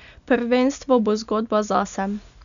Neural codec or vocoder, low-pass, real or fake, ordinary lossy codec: none; 7.2 kHz; real; none